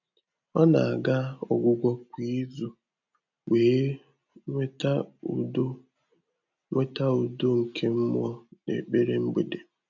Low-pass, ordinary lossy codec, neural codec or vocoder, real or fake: 7.2 kHz; none; none; real